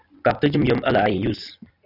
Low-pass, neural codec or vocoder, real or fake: 5.4 kHz; vocoder, 44.1 kHz, 128 mel bands every 256 samples, BigVGAN v2; fake